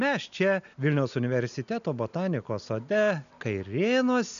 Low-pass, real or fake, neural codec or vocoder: 7.2 kHz; real; none